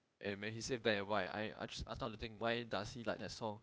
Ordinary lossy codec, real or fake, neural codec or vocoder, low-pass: none; fake; codec, 16 kHz, 0.8 kbps, ZipCodec; none